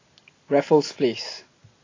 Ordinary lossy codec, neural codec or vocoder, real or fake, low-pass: AAC, 32 kbps; none; real; 7.2 kHz